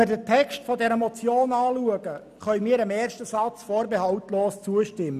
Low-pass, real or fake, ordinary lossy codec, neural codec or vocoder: 14.4 kHz; real; none; none